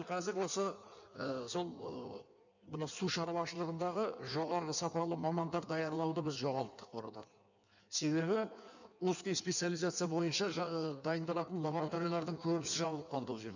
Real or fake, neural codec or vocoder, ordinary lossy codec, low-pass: fake; codec, 16 kHz in and 24 kHz out, 1.1 kbps, FireRedTTS-2 codec; none; 7.2 kHz